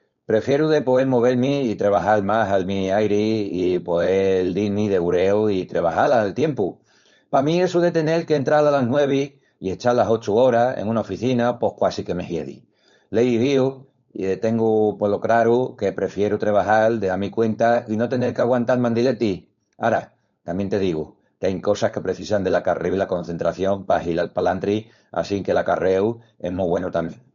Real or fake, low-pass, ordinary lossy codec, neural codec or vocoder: fake; 7.2 kHz; MP3, 48 kbps; codec, 16 kHz, 4.8 kbps, FACodec